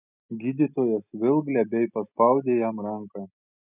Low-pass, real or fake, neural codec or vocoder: 3.6 kHz; real; none